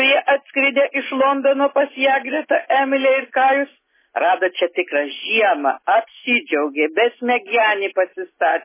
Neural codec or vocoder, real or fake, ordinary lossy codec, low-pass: none; real; MP3, 16 kbps; 3.6 kHz